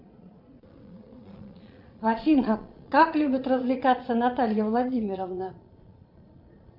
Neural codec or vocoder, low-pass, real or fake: vocoder, 22.05 kHz, 80 mel bands, Vocos; 5.4 kHz; fake